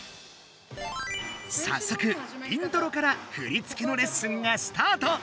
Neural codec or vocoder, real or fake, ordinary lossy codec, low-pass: none; real; none; none